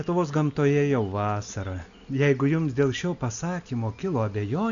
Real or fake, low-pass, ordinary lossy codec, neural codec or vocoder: real; 7.2 kHz; MP3, 96 kbps; none